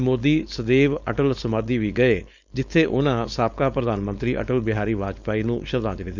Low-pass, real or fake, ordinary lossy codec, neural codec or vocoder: 7.2 kHz; fake; none; codec, 16 kHz, 4.8 kbps, FACodec